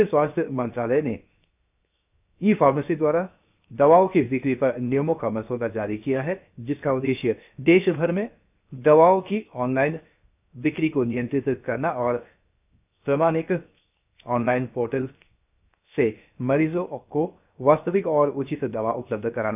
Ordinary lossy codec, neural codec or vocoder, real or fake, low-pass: none; codec, 16 kHz, 0.7 kbps, FocalCodec; fake; 3.6 kHz